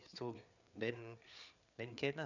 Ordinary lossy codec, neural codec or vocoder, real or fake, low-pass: none; codec, 16 kHz, 4 kbps, FreqCodec, larger model; fake; 7.2 kHz